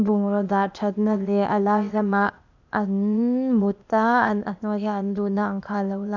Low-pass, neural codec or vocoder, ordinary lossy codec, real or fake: 7.2 kHz; codec, 16 kHz, 0.8 kbps, ZipCodec; none; fake